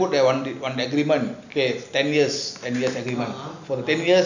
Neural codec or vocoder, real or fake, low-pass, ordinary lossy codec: none; real; 7.2 kHz; none